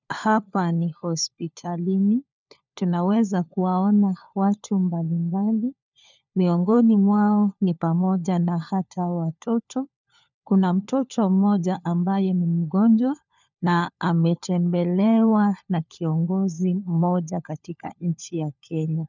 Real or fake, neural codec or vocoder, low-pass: fake; codec, 16 kHz, 4 kbps, FunCodec, trained on LibriTTS, 50 frames a second; 7.2 kHz